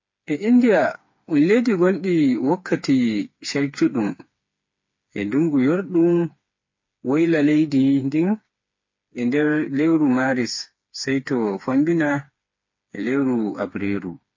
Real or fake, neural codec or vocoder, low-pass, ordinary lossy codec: fake; codec, 16 kHz, 4 kbps, FreqCodec, smaller model; 7.2 kHz; MP3, 32 kbps